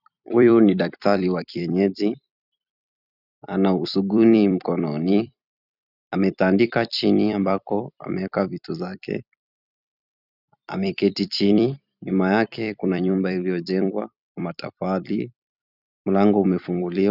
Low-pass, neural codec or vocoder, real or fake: 5.4 kHz; vocoder, 44.1 kHz, 128 mel bands every 256 samples, BigVGAN v2; fake